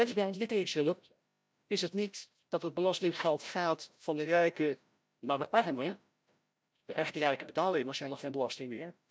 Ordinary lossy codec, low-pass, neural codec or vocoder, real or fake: none; none; codec, 16 kHz, 0.5 kbps, FreqCodec, larger model; fake